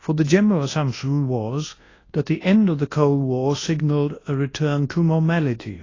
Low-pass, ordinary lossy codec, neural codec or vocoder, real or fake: 7.2 kHz; AAC, 32 kbps; codec, 24 kHz, 0.9 kbps, WavTokenizer, large speech release; fake